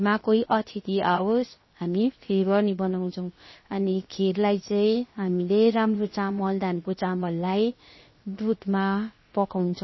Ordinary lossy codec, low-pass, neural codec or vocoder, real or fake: MP3, 24 kbps; 7.2 kHz; codec, 16 kHz, 0.7 kbps, FocalCodec; fake